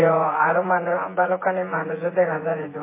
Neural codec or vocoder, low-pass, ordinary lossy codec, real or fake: vocoder, 24 kHz, 100 mel bands, Vocos; 3.6 kHz; MP3, 16 kbps; fake